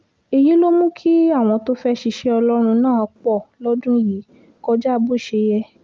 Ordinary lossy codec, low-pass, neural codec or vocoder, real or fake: Opus, 24 kbps; 7.2 kHz; none; real